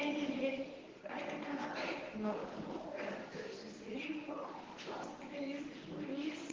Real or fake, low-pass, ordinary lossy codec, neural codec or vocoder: fake; 7.2 kHz; Opus, 16 kbps; codec, 24 kHz, 0.9 kbps, WavTokenizer, medium speech release version 1